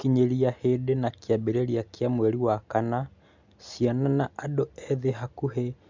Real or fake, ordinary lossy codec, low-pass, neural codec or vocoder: real; MP3, 64 kbps; 7.2 kHz; none